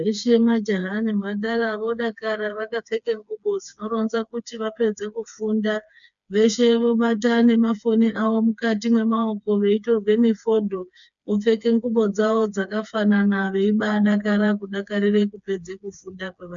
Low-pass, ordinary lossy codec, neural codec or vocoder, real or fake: 7.2 kHz; MP3, 96 kbps; codec, 16 kHz, 4 kbps, FreqCodec, smaller model; fake